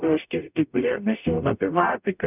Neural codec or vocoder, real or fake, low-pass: codec, 44.1 kHz, 0.9 kbps, DAC; fake; 3.6 kHz